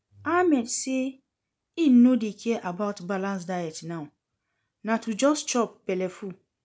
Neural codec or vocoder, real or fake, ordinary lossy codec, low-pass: none; real; none; none